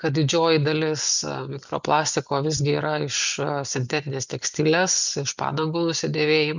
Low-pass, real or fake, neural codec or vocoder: 7.2 kHz; real; none